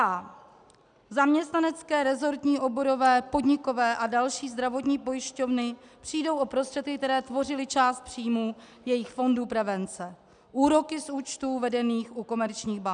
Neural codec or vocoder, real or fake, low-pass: none; real; 9.9 kHz